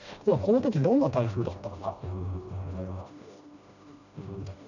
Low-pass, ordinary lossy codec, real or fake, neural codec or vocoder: 7.2 kHz; none; fake; codec, 16 kHz, 1 kbps, FreqCodec, smaller model